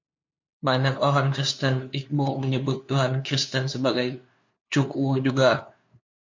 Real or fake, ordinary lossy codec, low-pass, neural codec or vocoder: fake; MP3, 48 kbps; 7.2 kHz; codec, 16 kHz, 2 kbps, FunCodec, trained on LibriTTS, 25 frames a second